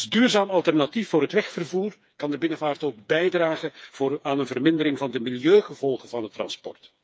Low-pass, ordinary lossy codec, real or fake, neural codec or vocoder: none; none; fake; codec, 16 kHz, 4 kbps, FreqCodec, smaller model